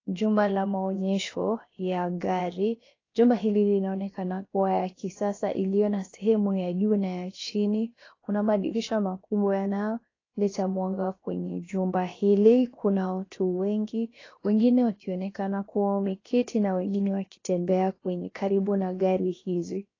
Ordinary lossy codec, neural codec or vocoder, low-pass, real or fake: AAC, 32 kbps; codec, 16 kHz, about 1 kbps, DyCAST, with the encoder's durations; 7.2 kHz; fake